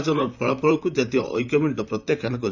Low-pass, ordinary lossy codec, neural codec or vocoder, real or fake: 7.2 kHz; none; vocoder, 44.1 kHz, 128 mel bands, Pupu-Vocoder; fake